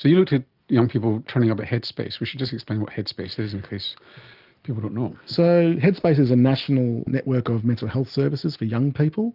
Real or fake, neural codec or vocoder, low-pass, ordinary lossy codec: real; none; 5.4 kHz; Opus, 24 kbps